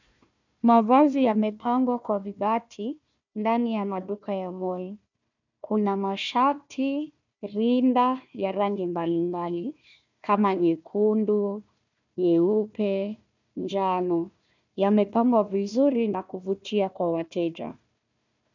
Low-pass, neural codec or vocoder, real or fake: 7.2 kHz; codec, 16 kHz, 1 kbps, FunCodec, trained on Chinese and English, 50 frames a second; fake